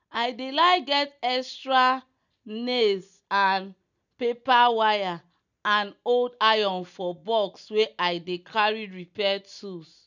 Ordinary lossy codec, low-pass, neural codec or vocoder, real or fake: none; 7.2 kHz; none; real